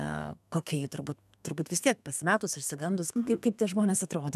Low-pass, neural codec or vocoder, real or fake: 14.4 kHz; codec, 32 kHz, 1.9 kbps, SNAC; fake